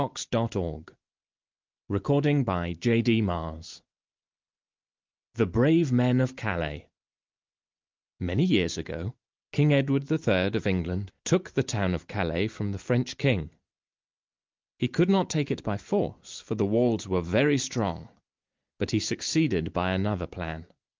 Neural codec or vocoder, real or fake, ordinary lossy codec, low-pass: none; real; Opus, 24 kbps; 7.2 kHz